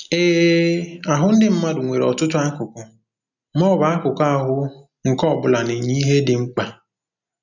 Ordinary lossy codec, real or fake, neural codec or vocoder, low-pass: none; real; none; 7.2 kHz